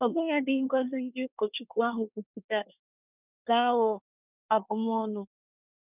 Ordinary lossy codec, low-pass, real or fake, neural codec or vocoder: none; 3.6 kHz; fake; codec, 24 kHz, 1 kbps, SNAC